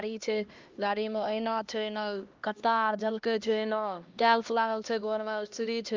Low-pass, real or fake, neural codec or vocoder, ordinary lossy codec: 7.2 kHz; fake; codec, 16 kHz, 1 kbps, X-Codec, HuBERT features, trained on LibriSpeech; Opus, 24 kbps